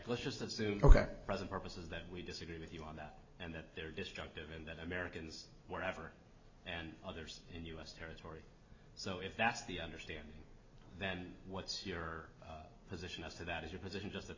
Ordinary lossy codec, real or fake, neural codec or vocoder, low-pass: MP3, 32 kbps; real; none; 7.2 kHz